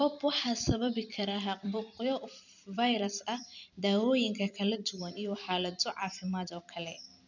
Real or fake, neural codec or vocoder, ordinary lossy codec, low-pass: fake; vocoder, 44.1 kHz, 128 mel bands every 256 samples, BigVGAN v2; none; 7.2 kHz